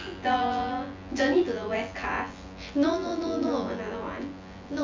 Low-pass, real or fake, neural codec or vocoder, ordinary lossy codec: 7.2 kHz; fake; vocoder, 24 kHz, 100 mel bands, Vocos; MP3, 64 kbps